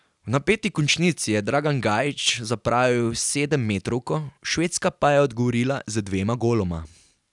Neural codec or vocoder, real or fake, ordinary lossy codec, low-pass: none; real; none; 10.8 kHz